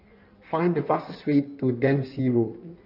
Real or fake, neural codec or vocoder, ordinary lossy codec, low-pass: fake; codec, 16 kHz in and 24 kHz out, 1.1 kbps, FireRedTTS-2 codec; none; 5.4 kHz